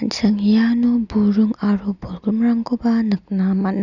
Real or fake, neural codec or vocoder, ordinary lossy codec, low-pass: real; none; none; 7.2 kHz